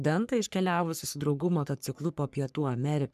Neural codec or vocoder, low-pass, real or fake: codec, 44.1 kHz, 3.4 kbps, Pupu-Codec; 14.4 kHz; fake